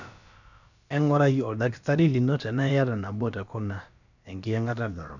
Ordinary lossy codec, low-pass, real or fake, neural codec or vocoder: none; 7.2 kHz; fake; codec, 16 kHz, about 1 kbps, DyCAST, with the encoder's durations